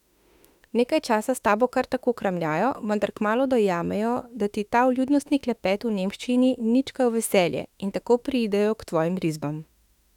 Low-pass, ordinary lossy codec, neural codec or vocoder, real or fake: 19.8 kHz; none; autoencoder, 48 kHz, 32 numbers a frame, DAC-VAE, trained on Japanese speech; fake